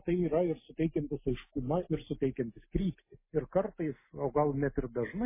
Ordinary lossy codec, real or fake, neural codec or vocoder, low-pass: MP3, 16 kbps; real; none; 3.6 kHz